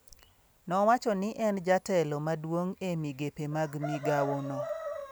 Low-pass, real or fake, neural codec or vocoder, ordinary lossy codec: none; real; none; none